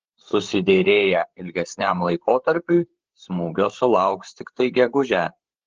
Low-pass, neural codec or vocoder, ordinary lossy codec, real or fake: 7.2 kHz; codec, 16 kHz, 8 kbps, FreqCodec, larger model; Opus, 16 kbps; fake